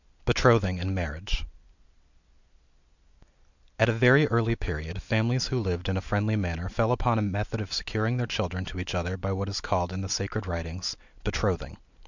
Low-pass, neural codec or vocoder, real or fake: 7.2 kHz; none; real